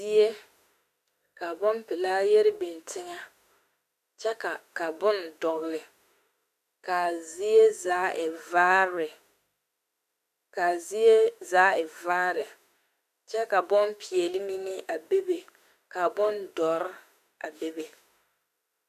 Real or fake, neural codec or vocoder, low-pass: fake; autoencoder, 48 kHz, 32 numbers a frame, DAC-VAE, trained on Japanese speech; 14.4 kHz